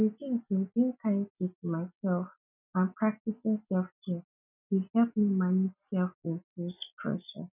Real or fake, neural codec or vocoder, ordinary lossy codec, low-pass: real; none; none; 3.6 kHz